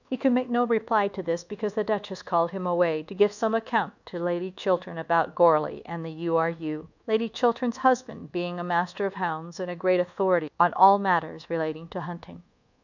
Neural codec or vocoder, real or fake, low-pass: codec, 24 kHz, 1.2 kbps, DualCodec; fake; 7.2 kHz